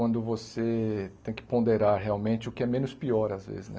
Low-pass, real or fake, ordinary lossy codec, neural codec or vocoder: none; real; none; none